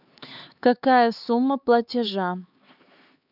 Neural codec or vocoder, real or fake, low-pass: codec, 16 kHz, 4 kbps, X-Codec, HuBERT features, trained on LibriSpeech; fake; 5.4 kHz